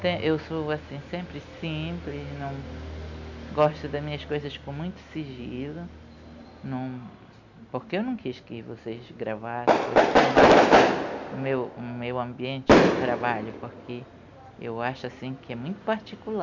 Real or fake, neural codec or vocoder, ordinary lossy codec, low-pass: real; none; none; 7.2 kHz